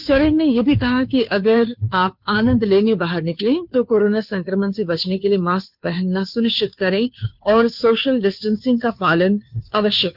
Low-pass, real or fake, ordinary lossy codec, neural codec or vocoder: 5.4 kHz; fake; none; codec, 16 kHz, 2 kbps, FunCodec, trained on Chinese and English, 25 frames a second